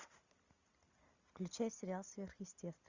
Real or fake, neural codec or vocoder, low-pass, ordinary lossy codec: real; none; 7.2 kHz; Opus, 64 kbps